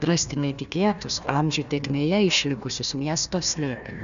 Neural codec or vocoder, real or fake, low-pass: codec, 16 kHz, 1 kbps, FunCodec, trained on Chinese and English, 50 frames a second; fake; 7.2 kHz